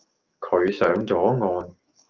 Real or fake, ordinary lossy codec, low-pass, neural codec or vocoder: fake; Opus, 32 kbps; 7.2 kHz; autoencoder, 48 kHz, 128 numbers a frame, DAC-VAE, trained on Japanese speech